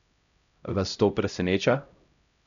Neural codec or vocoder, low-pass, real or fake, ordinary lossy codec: codec, 16 kHz, 0.5 kbps, X-Codec, HuBERT features, trained on LibriSpeech; 7.2 kHz; fake; none